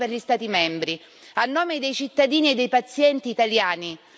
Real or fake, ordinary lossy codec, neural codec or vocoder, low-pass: real; none; none; none